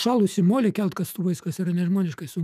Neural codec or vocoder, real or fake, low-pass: autoencoder, 48 kHz, 128 numbers a frame, DAC-VAE, trained on Japanese speech; fake; 14.4 kHz